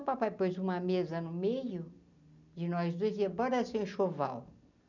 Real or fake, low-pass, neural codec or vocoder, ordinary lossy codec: real; 7.2 kHz; none; none